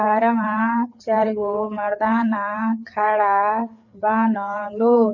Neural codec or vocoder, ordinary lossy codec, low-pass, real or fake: codec, 16 kHz, 4 kbps, FreqCodec, larger model; none; 7.2 kHz; fake